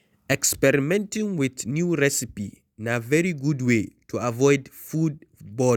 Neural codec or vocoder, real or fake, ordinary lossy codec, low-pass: none; real; none; 19.8 kHz